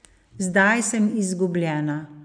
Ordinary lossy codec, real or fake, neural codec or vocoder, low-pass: none; real; none; 9.9 kHz